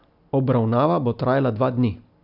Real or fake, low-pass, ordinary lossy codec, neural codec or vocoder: real; 5.4 kHz; none; none